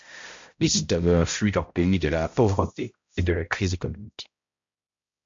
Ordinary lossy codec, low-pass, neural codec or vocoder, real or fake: MP3, 48 kbps; 7.2 kHz; codec, 16 kHz, 0.5 kbps, X-Codec, HuBERT features, trained on balanced general audio; fake